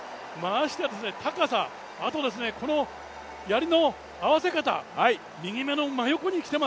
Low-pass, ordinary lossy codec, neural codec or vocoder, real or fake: none; none; none; real